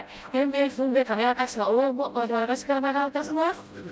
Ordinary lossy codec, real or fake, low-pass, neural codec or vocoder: none; fake; none; codec, 16 kHz, 0.5 kbps, FreqCodec, smaller model